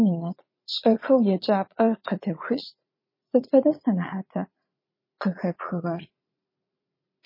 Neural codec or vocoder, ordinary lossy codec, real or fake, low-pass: vocoder, 44.1 kHz, 80 mel bands, Vocos; MP3, 24 kbps; fake; 5.4 kHz